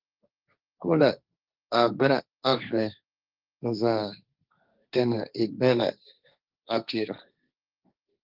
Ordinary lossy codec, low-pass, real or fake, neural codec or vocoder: Opus, 32 kbps; 5.4 kHz; fake; codec, 16 kHz, 1.1 kbps, Voila-Tokenizer